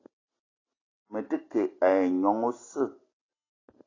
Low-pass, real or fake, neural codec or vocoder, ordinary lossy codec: 7.2 kHz; real; none; AAC, 32 kbps